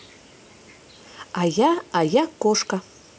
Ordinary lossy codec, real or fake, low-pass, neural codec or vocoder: none; real; none; none